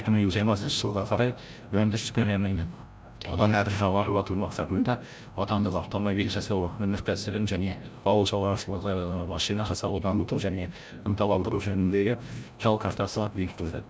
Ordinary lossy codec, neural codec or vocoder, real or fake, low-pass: none; codec, 16 kHz, 0.5 kbps, FreqCodec, larger model; fake; none